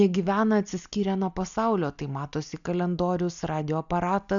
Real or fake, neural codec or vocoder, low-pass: real; none; 7.2 kHz